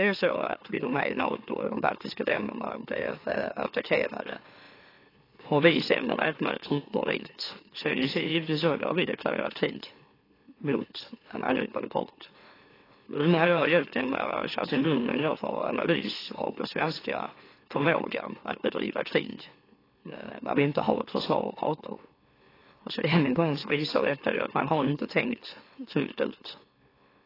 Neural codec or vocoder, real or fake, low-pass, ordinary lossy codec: autoencoder, 44.1 kHz, a latent of 192 numbers a frame, MeloTTS; fake; 5.4 kHz; AAC, 24 kbps